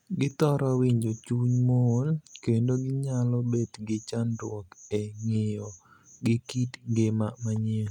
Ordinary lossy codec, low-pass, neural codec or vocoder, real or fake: none; 19.8 kHz; none; real